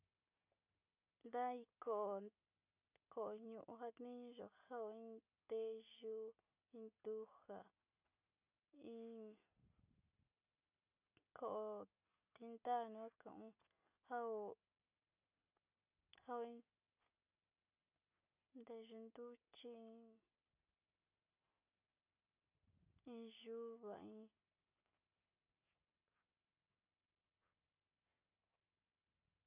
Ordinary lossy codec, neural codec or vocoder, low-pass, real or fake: none; none; 3.6 kHz; real